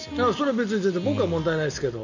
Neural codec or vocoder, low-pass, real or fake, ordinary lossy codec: none; 7.2 kHz; real; none